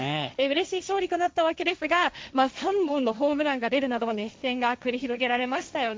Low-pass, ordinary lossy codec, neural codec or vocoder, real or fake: none; none; codec, 16 kHz, 1.1 kbps, Voila-Tokenizer; fake